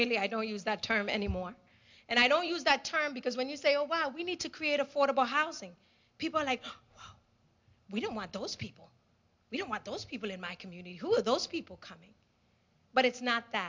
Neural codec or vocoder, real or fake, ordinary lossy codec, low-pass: none; real; MP3, 64 kbps; 7.2 kHz